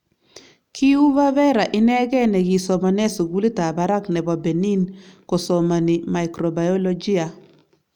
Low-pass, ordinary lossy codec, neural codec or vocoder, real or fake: 19.8 kHz; none; none; real